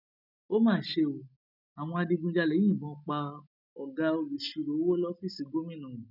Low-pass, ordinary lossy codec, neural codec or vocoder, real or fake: 5.4 kHz; none; none; real